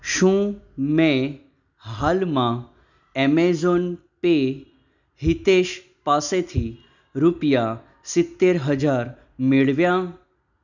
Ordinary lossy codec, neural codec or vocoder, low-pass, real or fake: none; none; 7.2 kHz; real